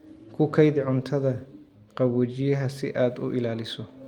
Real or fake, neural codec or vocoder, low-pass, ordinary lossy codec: real; none; 19.8 kHz; Opus, 32 kbps